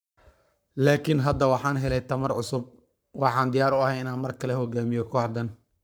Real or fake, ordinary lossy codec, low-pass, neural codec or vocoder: fake; none; none; codec, 44.1 kHz, 7.8 kbps, Pupu-Codec